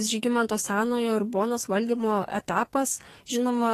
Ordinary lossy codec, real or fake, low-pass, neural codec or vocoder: AAC, 48 kbps; fake; 14.4 kHz; codec, 44.1 kHz, 2.6 kbps, SNAC